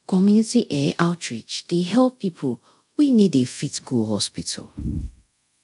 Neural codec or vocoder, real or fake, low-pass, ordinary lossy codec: codec, 24 kHz, 0.5 kbps, DualCodec; fake; 10.8 kHz; none